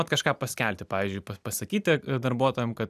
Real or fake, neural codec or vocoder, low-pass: real; none; 14.4 kHz